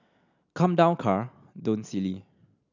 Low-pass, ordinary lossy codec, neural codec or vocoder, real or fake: 7.2 kHz; none; none; real